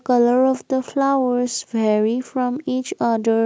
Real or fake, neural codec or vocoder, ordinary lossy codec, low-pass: real; none; none; none